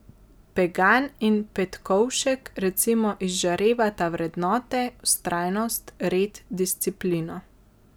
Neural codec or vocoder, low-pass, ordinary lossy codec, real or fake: none; none; none; real